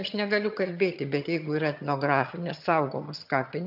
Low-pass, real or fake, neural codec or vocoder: 5.4 kHz; fake; vocoder, 22.05 kHz, 80 mel bands, HiFi-GAN